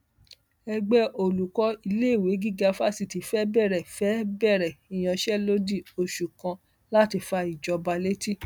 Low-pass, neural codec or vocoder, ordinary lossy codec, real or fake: none; none; none; real